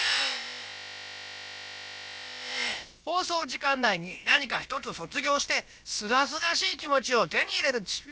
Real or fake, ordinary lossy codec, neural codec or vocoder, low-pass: fake; none; codec, 16 kHz, about 1 kbps, DyCAST, with the encoder's durations; none